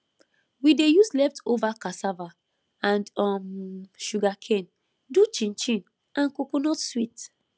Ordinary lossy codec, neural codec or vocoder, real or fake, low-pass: none; none; real; none